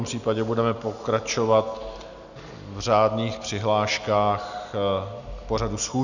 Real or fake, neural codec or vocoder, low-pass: real; none; 7.2 kHz